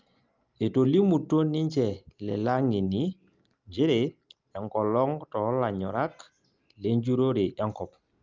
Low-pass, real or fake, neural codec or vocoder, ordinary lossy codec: 7.2 kHz; real; none; Opus, 24 kbps